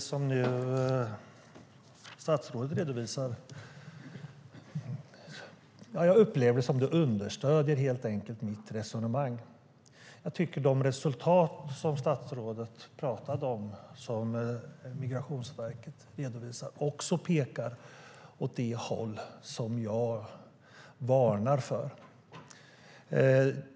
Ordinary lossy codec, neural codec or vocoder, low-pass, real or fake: none; none; none; real